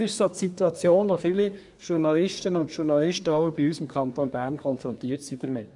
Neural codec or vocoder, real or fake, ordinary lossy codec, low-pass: codec, 24 kHz, 1 kbps, SNAC; fake; none; 10.8 kHz